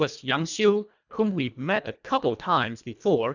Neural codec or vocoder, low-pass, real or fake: codec, 24 kHz, 1.5 kbps, HILCodec; 7.2 kHz; fake